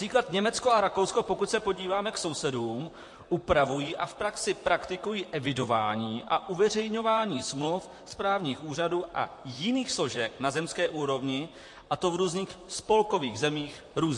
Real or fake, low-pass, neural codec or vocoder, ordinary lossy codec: fake; 10.8 kHz; vocoder, 44.1 kHz, 128 mel bands, Pupu-Vocoder; MP3, 48 kbps